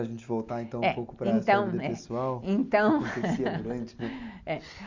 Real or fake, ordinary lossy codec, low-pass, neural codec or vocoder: real; Opus, 64 kbps; 7.2 kHz; none